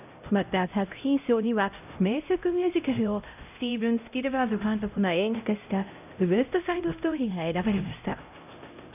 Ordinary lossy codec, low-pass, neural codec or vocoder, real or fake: none; 3.6 kHz; codec, 16 kHz, 0.5 kbps, X-Codec, HuBERT features, trained on LibriSpeech; fake